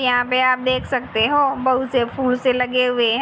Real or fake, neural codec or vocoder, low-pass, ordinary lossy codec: real; none; none; none